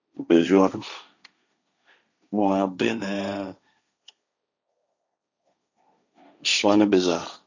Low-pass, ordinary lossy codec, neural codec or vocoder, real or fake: 7.2 kHz; none; codec, 16 kHz, 1.1 kbps, Voila-Tokenizer; fake